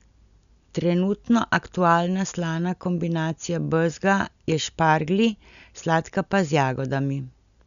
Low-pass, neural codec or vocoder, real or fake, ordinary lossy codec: 7.2 kHz; none; real; none